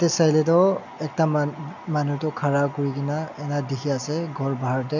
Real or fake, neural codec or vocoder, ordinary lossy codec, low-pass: real; none; none; 7.2 kHz